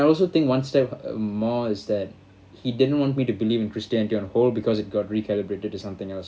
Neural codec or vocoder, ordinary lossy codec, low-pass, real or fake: none; none; none; real